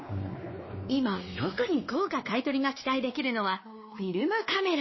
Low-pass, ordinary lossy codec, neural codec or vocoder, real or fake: 7.2 kHz; MP3, 24 kbps; codec, 16 kHz, 2 kbps, X-Codec, WavLM features, trained on Multilingual LibriSpeech; fake